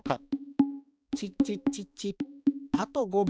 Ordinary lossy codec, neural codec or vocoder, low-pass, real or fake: none; codec, 16 kHz, 2 kbps, X-Codec, HuBERT features, trained on balanced general audio; none; fake